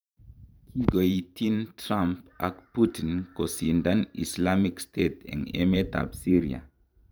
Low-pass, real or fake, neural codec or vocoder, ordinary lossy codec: none; fake; vocoder, 44.1 kHz, 128 mel bands every 512 samples, BigVGAN v2; none